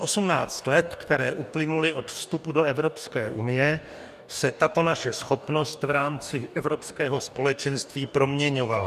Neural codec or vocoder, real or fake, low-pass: codec, 44.1 kHz, 2.6 kbps, DAC; fake; 14.4 kHz